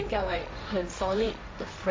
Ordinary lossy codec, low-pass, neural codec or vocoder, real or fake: none; none; codec, 16 kHz, 1.1 kbps, Voila-Tokenizer; fake